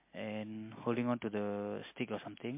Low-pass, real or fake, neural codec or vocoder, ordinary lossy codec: 3.6 kHz; real; none; MP3, 24 kbps